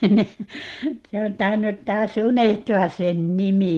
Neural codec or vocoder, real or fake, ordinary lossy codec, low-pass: none; real; Opus, 16 kbps; 14.4 kHz